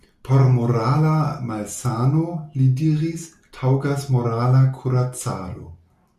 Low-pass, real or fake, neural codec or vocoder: 14.4 kHz; real; none